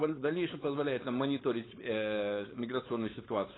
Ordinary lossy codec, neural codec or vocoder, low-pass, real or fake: AAC, 16 kbps; codec, 16 kHz, 4.8 kbps, FACodec; 7.2 kHz; fake